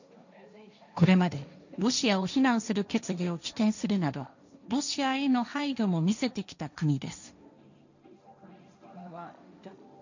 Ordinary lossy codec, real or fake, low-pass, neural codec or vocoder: none; fake; 7.2 kHz; codec, 16 kHz, 1.1 kbps, Voila-Tokenizer